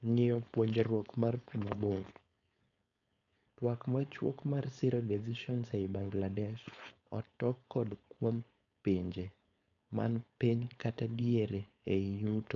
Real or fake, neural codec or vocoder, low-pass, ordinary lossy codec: fake; codec, 16 kHz, 4.8 kbps, FACodec; 7.2 kHz; none